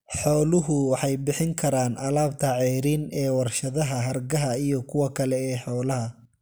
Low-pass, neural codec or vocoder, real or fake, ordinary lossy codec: none; none; real; none